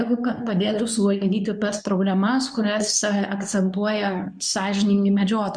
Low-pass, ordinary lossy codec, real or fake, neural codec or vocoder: 9.9 kHz; MP3, 96 kbps; fake; codec, 24 kHz, 0.9 kbps, WavTokenizer, medium speech release version 2